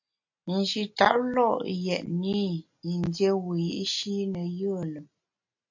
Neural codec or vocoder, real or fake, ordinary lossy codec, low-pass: none; real; AAC, 48 kbps; 7.2 kHz